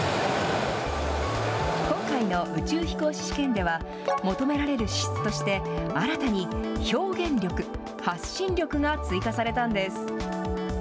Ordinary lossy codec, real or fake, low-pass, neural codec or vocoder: none; real; none; none